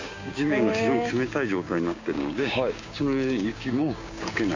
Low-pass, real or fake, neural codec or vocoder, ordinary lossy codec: 7.2 kHz; fake; codec, 16 kHz, 6 kbps, DAC; AAC, 48 kbps